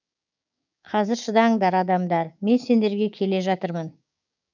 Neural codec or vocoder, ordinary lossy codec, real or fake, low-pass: codec, 16 kHz, 6 kbps, DAC; none; fake; 7.2 kHz